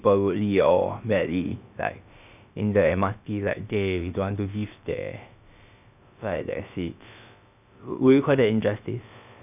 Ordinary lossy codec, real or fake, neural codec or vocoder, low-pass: none; fake; codec, 16 kHz, about 1 kbps, DyCAST, with the encoder's durations; 3.6 kHz